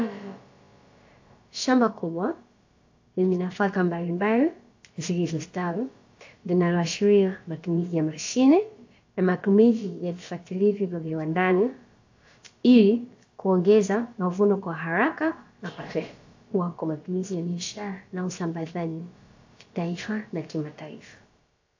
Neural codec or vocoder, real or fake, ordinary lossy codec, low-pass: codec, 16 kHz, about 1 kbps, DyCAST, with the encoder's durations; fake; AAC, 48 kbps; 7.2 kHz